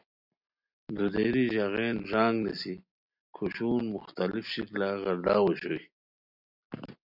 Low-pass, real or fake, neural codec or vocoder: 5.4 kHz; real; none